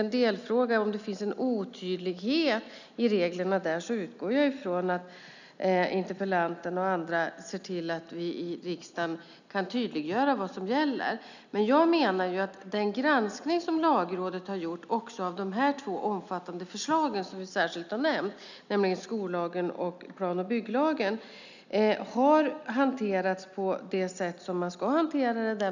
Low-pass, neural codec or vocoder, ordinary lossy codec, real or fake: 7.2 kHz; none; none; real